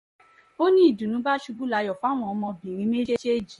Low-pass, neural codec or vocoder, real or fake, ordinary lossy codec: 19.8 kHz; vocoder, 44.1 kHz, 128 mel bands, Pupu-Vocoder; fake; MP3, 48 kbps